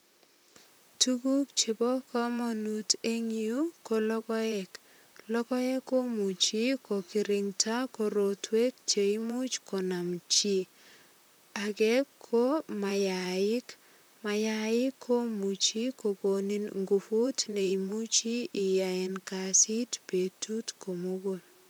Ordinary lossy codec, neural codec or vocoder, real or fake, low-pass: none; vocoder, 44.1 kHz, 128 mel bands, Pupu-Vocoder; fake; none